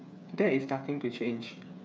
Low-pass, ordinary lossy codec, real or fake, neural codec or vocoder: none; none; fake; codec, 16 kHz, 8 kbps, FreqCodec, smaller model